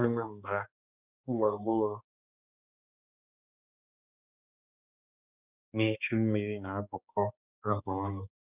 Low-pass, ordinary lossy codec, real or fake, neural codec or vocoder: 3.6 kHz; none; fake; codec, 16 kHz, 2 kbps, X-Codec, HuBERT features, trained on balanced general audio